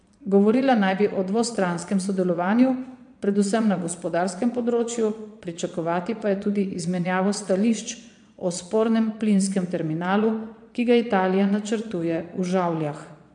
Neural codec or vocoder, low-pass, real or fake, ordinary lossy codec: vocoder, 22.05 kHz, 80 mel bands, Vocos; 9.9 kHz; fake; MP3, 64 kbps